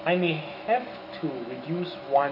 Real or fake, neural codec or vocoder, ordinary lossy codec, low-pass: fake; autoencoder, 48 kHz, 128 numbers a frame, DAC-VAE, trained on Japanese speech; none; 5.4 kHz